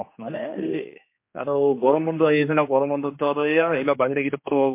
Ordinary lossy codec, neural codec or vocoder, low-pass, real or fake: MP3, 24 kbps; codec, 16 kHz, 2 kbps, X-Codec, HuBERT features, trained on general audio; 3.6 kHz; fake